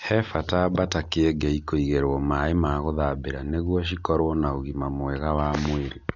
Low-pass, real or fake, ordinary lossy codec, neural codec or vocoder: 7.2 kHz; real; none; none